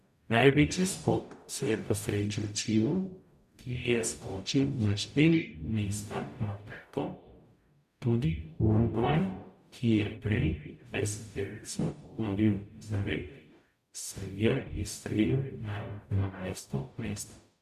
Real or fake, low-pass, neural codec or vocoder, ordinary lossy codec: fake; 14.4 kHz; codec, 44.1 kHz, 0.9 kbps, DAC; none